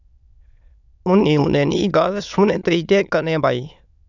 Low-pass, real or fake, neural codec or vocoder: 7.2 kHz; fake; autoencoder, 22.05 kHz, a latent of 192 numbers a frame, VITS, trained on many speakers